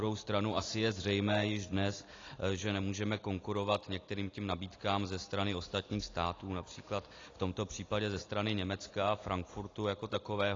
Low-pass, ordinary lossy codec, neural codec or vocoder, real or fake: 7.2 kHz; AAC, 32 kbps; none; real